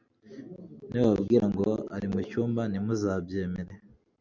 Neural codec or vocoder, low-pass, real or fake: none; 7.2 kHz; real